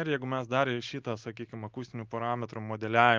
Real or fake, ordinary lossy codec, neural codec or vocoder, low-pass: real; Opus, 32 kbps; none; 7.2 kHz